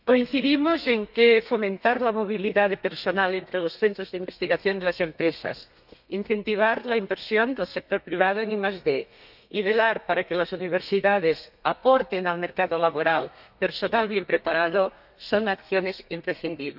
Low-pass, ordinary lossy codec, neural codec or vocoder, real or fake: 5.4 kHz; none; codec, 32 kHz, 1.9 kbps, SNAC; fake